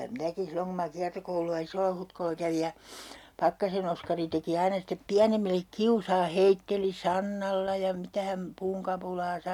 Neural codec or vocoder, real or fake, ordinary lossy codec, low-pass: none; real; none; 19.8 kHz